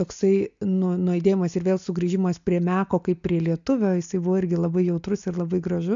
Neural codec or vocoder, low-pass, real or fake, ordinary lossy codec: none; 7.2 kHz; real; MP3, 64 kbps